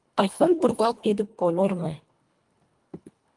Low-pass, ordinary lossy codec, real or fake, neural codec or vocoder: 10.8 kHz; Opus, 32 kbps; fake; codec, 24 kHz, 1.5 kbps, HILCodec